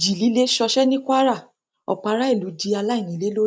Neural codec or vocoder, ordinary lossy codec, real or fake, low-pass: none; none; real; none